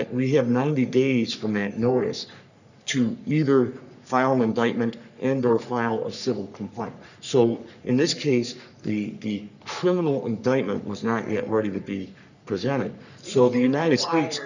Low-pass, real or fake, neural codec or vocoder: 7.2 kHz; fake; codec, 44.1 kHz, 3.4 kbps, Pupu-Codec